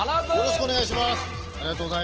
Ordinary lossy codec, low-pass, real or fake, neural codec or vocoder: Opus, 16 kbps; 7.2 kHz; real; none